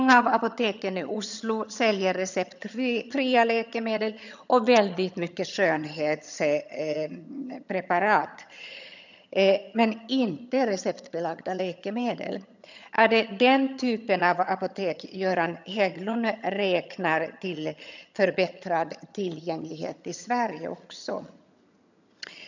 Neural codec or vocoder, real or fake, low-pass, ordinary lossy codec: vocoder, 22.05 kHz, 80 mel bands, HiFi-GAN; fake; 7.2 kHz; none